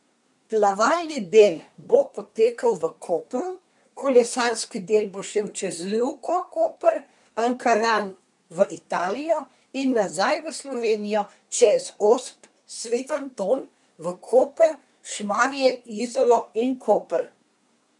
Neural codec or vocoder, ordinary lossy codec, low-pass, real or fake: codec, 24 kHz, 1 kbps, SNAC; none; 10.8 kHz; fake